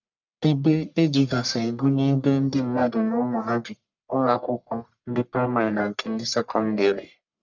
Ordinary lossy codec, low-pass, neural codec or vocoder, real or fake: none; 7.2 kHz; codec, 44.1 kHz, 1.7 kbps, Pupu-Codec; fake